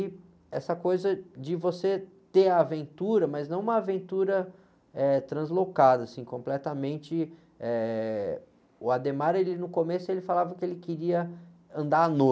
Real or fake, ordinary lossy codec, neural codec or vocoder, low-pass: real; none; none; none